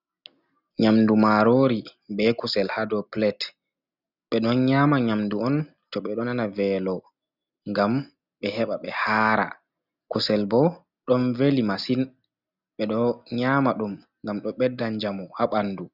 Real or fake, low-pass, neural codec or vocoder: real; 5.4 kHz; none